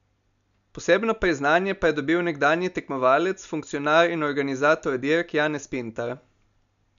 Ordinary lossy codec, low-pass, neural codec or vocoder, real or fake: none; 7.2 kHz; none; real